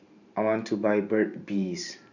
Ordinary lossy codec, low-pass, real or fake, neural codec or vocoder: none; 7.2 kHz; fake; vocoder, 44.1 kHz, 128 mel bands every 512 samples, BigVGAN v2